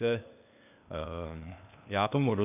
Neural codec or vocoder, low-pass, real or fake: codec, 16 kHz, 0.8 kbps, ZipCodec; 3.6 kHz; fake